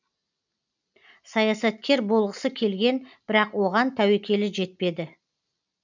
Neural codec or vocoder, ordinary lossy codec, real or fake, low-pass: none; none; real; 7.2 kHz